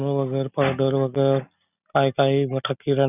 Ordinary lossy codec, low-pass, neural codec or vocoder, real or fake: none; 3.6 kHz; none; real